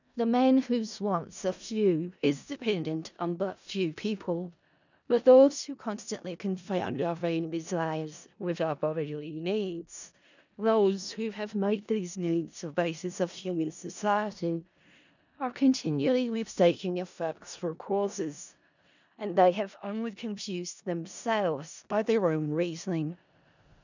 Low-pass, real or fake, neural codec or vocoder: 7.2 kHz; fake; codec, 16 kHz in and 24 kHz out, 0.4 kbps, LongCat-Audio-Codec, four codebook decoder